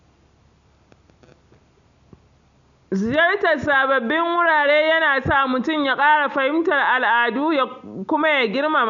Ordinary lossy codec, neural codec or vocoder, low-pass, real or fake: none; none; 7.2 kHz; real